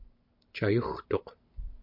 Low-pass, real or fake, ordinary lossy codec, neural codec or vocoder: 5.4 kHz; real; MP3, 48 kbps; none